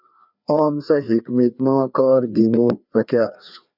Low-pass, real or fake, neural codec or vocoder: 5.4 kHz; fake; codec, 16 kHz, 2 kbps, FreqCodec, larger model